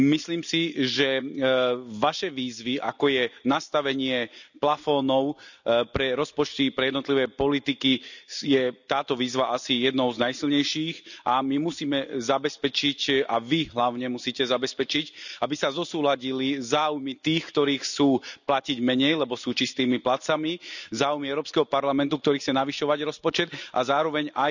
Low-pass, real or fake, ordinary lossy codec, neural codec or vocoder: 7.2 kHz; real; none; none